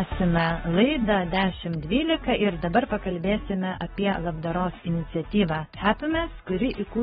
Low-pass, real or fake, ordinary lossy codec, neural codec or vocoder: 19.8 kHz; fake; AAC, 16 kbps; codec, 44.1 kHz, 7.8 kbps, DAC